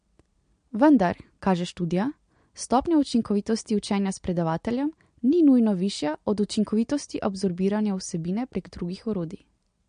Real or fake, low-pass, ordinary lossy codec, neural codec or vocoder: real; 9.9 kHz; MP3, 48 kbps; none